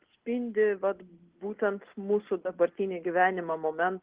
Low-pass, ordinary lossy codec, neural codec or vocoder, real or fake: 3.6 kHz; Opus, 16 kbps; none; real